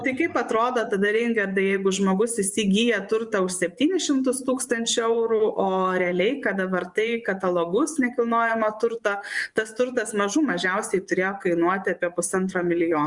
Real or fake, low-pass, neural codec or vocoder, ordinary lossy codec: fake; 10.8 kHz; vocoder, 24 kHz, 100 mel bands, Vocos; Opus, 64 kbps